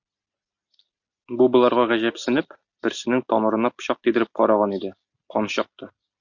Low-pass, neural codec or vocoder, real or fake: 7.2 kHz; none; real